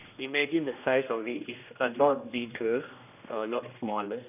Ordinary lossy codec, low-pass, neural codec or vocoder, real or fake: none; 3.6 kHz; codec, 16 kHz, 1 kbps, X-Codec, HuBERT features, trained on general audio; fake